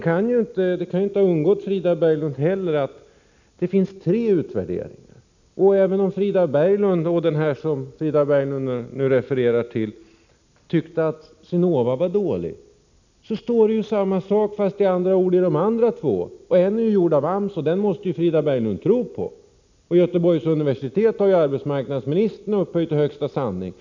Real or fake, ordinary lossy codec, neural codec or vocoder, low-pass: real; none; none; 7.2 kHz